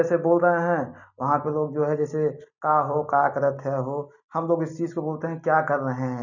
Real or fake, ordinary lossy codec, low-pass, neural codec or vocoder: real; none; 7.2 kHz; none